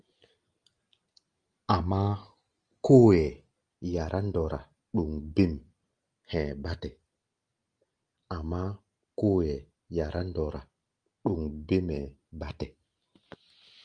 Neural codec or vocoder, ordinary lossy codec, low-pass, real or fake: none; Opus, 32 kbps; 9.9 kHz; real